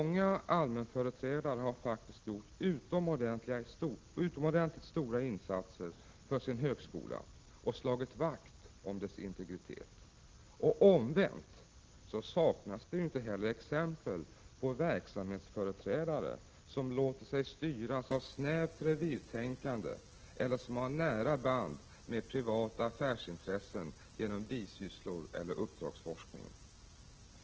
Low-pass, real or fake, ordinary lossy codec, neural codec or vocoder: 7.2 kHz; real; Opus, 16 kbps; none